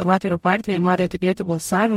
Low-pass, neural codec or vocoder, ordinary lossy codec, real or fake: 19.8 kHz; codec, 44.1 kHz, 0.9 kbps, DAC; MP3, 64 kbps; fake